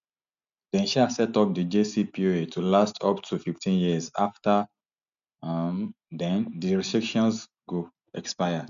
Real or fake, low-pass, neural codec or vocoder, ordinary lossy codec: real; 7.2 kHz; none; MP3, 64 kbps